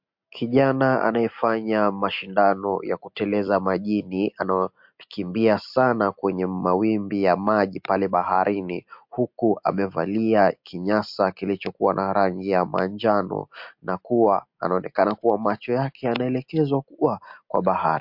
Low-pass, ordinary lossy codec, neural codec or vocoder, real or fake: 5.4 kHz; MP3, 48 kbps; none; real